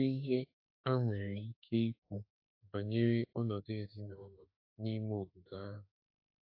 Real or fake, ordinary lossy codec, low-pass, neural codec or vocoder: fake; AAC, 32 kbps; 5.4 kHz; autoencoder, 48 kHz, 32 numbers a frame, DAC-VAE, trained on Japanese speech